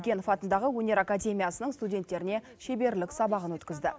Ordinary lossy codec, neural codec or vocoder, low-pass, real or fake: none; none; none; real